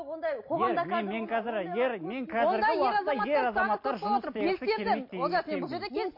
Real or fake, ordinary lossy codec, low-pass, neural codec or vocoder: real; MP3, 32 kbps; 5.4 kHz; none